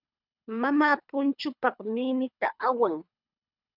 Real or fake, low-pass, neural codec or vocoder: fake; 5.4 kHz; codec, 24 kHz, 3 kbps, HILCodec